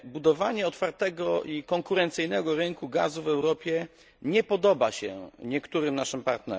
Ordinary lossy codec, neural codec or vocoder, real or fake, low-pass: none; none; real; none